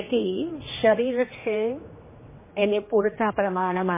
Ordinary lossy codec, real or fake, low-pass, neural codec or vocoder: MP3, 16 kbps; fake; 3.6 kHz; codec, 16 kHz, 2 kbps, X-Codec, HuBERT features, trained on general audio